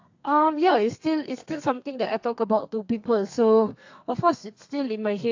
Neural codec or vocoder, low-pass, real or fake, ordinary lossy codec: codec, 32 kHz, 1.9 kbps, SNAC; 7.2 kHz; fake; AAC, 48 kbps